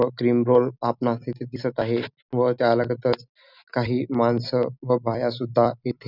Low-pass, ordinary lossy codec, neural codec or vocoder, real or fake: 5.4 kHz; none; none; real